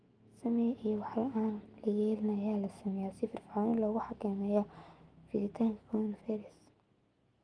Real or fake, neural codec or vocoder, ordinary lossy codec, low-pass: fake; autoencoder, 48 kHz, 128 numbers a frame, DAC-VAE, trained on Japanese speech; Opus, 24 kbps; 9.9 kHz